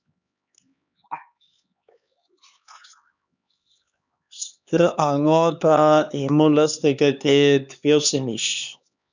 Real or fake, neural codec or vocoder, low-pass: fake; codec, 16 kHz, 2 kbps, X-Codec, HuBERT features, trained on LibriSpeech; 7.2 kHz